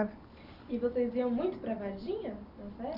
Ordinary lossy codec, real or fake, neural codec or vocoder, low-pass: none; real; none; 5.4 kHz